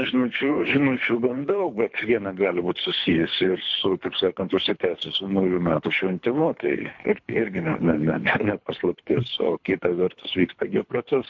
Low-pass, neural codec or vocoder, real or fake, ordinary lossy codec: 7.2 kHz; codec, 24 kHz, 3 kbps, HILCodec; fake; MP3, 48 kbps